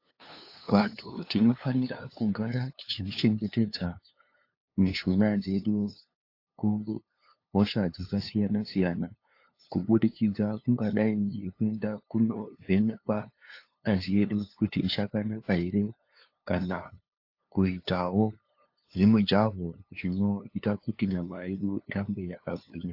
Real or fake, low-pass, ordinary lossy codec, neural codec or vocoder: fake; 5.4 kHz; AAC, 32 kbps; codec, 16 kHz, 2 kbps, FunCodec, trained on LibriTTS, 25 frames a second